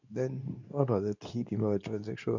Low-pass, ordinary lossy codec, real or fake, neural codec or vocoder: 7.2 kHz; none; fake; codec, 24 kHz, 0.9 kbps, WavTokenizer, medium speech release version 2